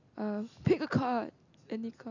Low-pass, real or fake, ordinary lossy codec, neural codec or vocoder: 7.2 kHz; real; none; none